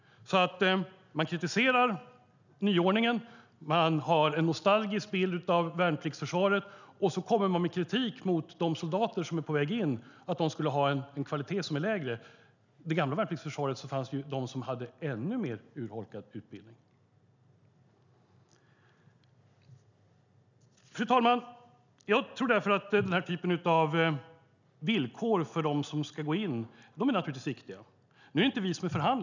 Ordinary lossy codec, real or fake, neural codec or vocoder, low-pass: none; real; none; 7.2 kHz